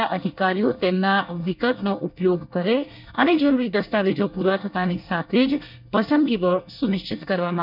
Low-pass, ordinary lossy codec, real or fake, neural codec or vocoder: 5.4 kHz; none; fake; codec, 24 kHz, 1 kbps, SNAC